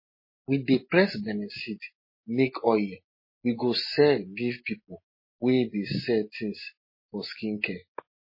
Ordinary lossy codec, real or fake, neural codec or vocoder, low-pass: MP3, 24 kbps; real; none; 5.4 kHz